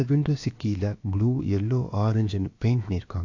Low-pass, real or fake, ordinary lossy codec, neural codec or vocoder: 7.2 kHz; fake; AAC, 48 kbps; codec, 16 kHz, about 1 kbps, DyCAST, with the encoder's durations